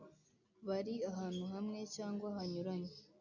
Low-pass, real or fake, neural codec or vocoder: 7.2 kHz; real; none